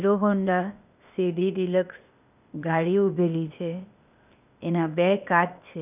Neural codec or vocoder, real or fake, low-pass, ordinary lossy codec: codec, 16 kHz, 0.8 kbps, ZipCodec; fake; 3.6 kHz; none